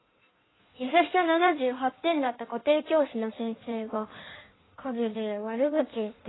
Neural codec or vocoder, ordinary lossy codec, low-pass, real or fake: codec, 16 kHz in and 24 kHz out, 1.1 kbps, FireRedTTS-2 codec; AAC, 16 kbps; 7.2 kHz; fake